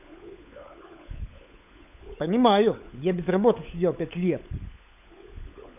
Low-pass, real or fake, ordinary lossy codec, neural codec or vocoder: 3.6 kHz; fake; none; codec, 16 kHz, 16 kbps, FunCodec, trained on LibriTTS, 50 frames a second